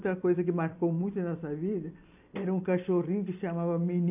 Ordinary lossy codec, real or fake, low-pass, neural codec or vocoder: AAC, 32 kbps; fake; 3.6 kHz; vocoder, 44.1 kHz, 128 mel bands every 256 samples, BigVGAN v2